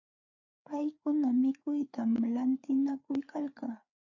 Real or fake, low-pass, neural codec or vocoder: fake; 7.2 kHz; codec, 16 kHz, 4 kbps, FreqCodec, larger model